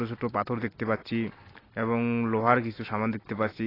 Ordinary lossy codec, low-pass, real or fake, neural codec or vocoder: AAC, 24 kbps; 5.4 kHz; real; none